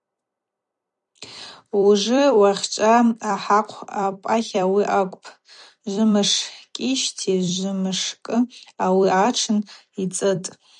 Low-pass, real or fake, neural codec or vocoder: 10.8 kHz; fake; vocoder, 44.1 kHz, 128 mel bands every 256 samples, BigVGAN v2